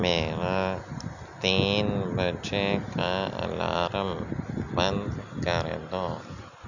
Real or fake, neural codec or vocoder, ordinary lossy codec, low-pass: real; none; none; 7.2 kHz